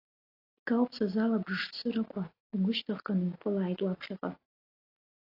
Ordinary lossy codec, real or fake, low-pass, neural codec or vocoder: AAC, 24 kbps; real; 5.4 kHz; none